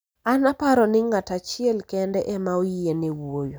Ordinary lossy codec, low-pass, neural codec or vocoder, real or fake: none; none; none; real